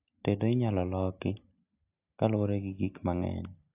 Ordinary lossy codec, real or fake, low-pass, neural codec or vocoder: AAC, 32 kbps; real; 3.6 kHz; none